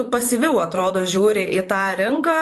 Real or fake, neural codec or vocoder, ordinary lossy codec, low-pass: fake; vocoder, 44.1 kHz, 128 mel bands, Pupu-Vocoder; AAC, 64 kbps; 14.4 kHz